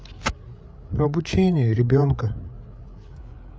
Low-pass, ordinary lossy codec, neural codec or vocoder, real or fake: none; none; codec, 16 kHz, 8 kbps, FreqCodec, larger model; fake